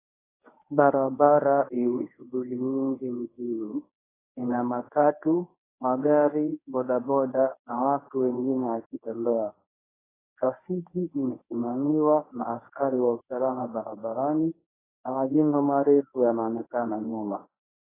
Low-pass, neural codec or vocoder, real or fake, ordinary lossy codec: 3.6 kHz; codec, 24 kHz, 0.9 kbps, WavTokenizer, medium speech release version 1; fake; AAC, 16 kbps